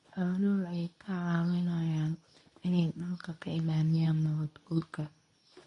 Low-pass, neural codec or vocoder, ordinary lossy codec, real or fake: 10.8 kHz; codec, 24 kHz, 0.9 kbps, WavTokenizer, medium speech release version 2; MP3, 48 kbps; fake